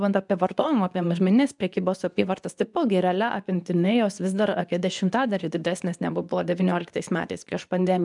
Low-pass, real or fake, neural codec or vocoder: 10.8 kHz; fake; codec, 24 kHz, 0.9 kbps, WavTokenizer, medium speech release version 2